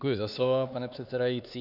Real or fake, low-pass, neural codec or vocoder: fake; 5.4 kHz; codec, 16 kHz, 4 kbps, X-Codec, HuBERT features, trained on LibriSpeech